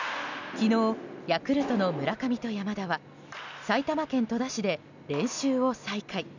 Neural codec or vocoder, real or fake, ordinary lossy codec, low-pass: none; real; none; 7.2 kHz